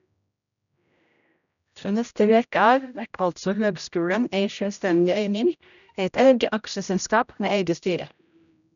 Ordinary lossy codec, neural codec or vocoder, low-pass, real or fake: none; codec, 16 kHz, 0.5 kbps, X-Codec, HuBERT features, trained on general audio; 7.2 kHz; fake